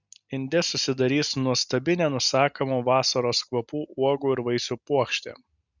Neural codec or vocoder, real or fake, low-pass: none; real; 7.2 kHz